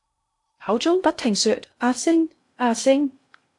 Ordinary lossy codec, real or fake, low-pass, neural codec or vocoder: AAC, 64 kbps; fake; 10.8 kHz; codec, 16 kHz in and 24 kHz out, 0.6 kbps, FocalCodec, streaming, 2048 codes